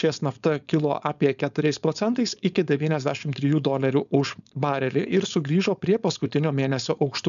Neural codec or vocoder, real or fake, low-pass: codec, 16 kHz, 4.8 kbps, FACodec; fake; 7.2 kHz